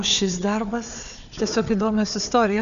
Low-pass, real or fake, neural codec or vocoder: 7.2 kHz; fake; codec, 16 kHz, 4 kbps, FunCodec, trained on Chinese and English, 50 frames a second